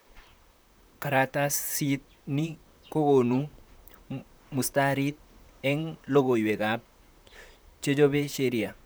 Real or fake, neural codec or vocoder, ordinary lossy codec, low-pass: fake; vocoder, 44.1 kHz, 128 mel bands, Pupu-Vocoder; none; none